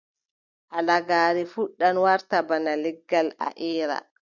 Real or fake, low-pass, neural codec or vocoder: real; 7.2 kHz; none